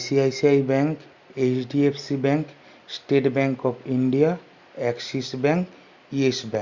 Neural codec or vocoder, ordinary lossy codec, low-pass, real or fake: none; Opus, 64 kbps; 7.2 kHz; real